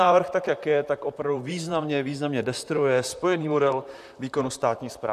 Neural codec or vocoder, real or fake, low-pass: vocoder, 44.1 kHz, 128 mel bands, Pupu-Vocoder; fake; 14.4 kHz